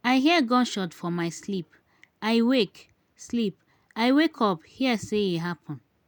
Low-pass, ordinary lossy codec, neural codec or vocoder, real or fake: 19.8 kHz; none; none; real